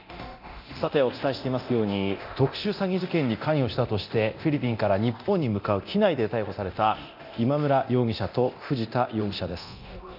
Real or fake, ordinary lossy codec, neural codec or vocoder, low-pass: fake; none; codec, 24 kHz, 0.9 kbps, DualCodec; 5.4 kHz